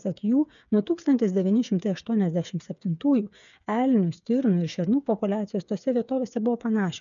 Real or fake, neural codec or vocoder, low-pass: fake; codec, 16 kHz, 8 kbps, FreqCodec, smaller model; 7.2 kHz